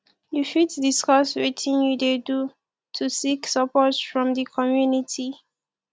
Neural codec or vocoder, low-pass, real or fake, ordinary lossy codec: none; none; real; none